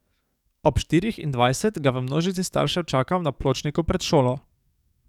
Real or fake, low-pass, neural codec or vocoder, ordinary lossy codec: fake; 19.8 kHz; codec, 44.1 kHz, 7.8 kbps, DAC; none